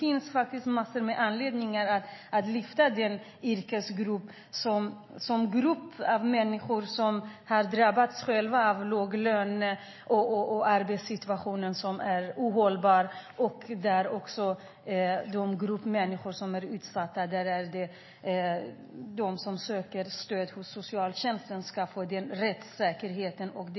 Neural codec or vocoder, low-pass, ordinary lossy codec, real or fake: none; 7.2 kHz; MP3, 24 kbps; real